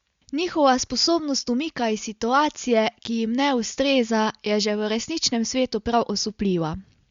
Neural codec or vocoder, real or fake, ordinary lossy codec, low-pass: none; real; Opus, 64 kbps; 7.2 kHz